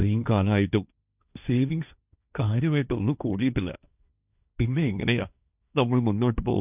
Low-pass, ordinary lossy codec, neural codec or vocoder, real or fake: 3.6 kHz; none; codec, 16 kHz, 1.1 kbps, Voila-Tokenizer; fake